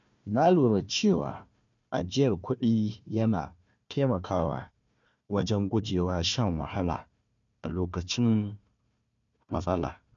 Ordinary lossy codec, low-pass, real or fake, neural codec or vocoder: MP3, 64 kbps; 7.2 kHz; fake; codec, 16 kHz, 1 kbps, FunCodec, trained on Chinese and English, 50 frames a second